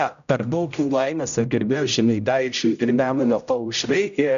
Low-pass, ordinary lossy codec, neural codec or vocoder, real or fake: 7.2 kHz; MP3, 96 kbps; codec, 16 kHz, 0.5 kbps, X-Codec, HuBERT features, trained on general audio; fake